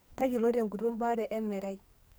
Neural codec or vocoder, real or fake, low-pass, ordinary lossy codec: codec, 44.1 kHz, 2.6 kbps, SNAC; fake; none; none